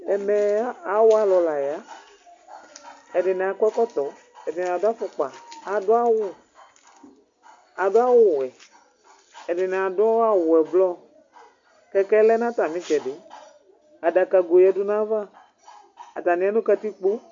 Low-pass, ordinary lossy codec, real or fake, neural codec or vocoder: 7.2 kHz; MP3, 48 kbps; real; none